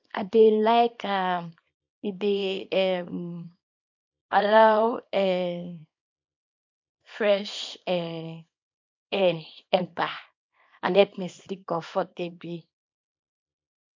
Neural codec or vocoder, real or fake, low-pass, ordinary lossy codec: codec, 24 kHz, 0.9 kbps, WavTokenizer, small release; fake; 7.2 kHz; MP3, 48 kbps